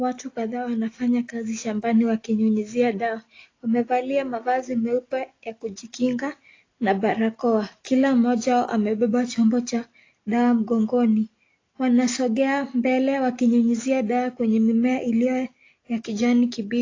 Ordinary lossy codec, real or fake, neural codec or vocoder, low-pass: AAC, 32 kbps; real; none; 7.2 kHz